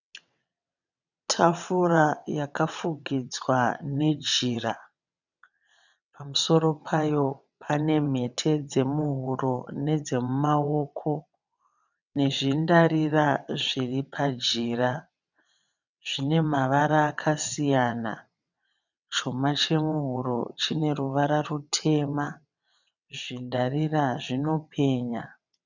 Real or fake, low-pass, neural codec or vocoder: fake; 7.2 kHz; vocoder, 22.05 kHz, 80 mel bands, WaveNeXt